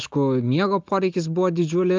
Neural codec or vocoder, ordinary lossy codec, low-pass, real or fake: none; Opus, 32 kbps; 7.2 kHz; real